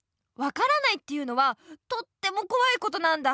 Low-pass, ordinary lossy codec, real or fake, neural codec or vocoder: none; none; real; none